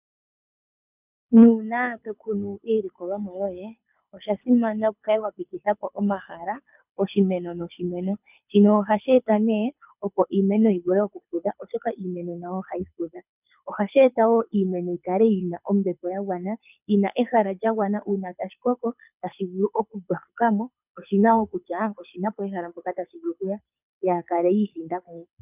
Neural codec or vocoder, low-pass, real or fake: codec, 24 kHz, 6 kbps, HILCodec; 3.6 kHz; fake